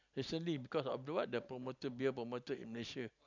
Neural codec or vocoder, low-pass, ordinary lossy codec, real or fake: none; 7.2 kHz; none; real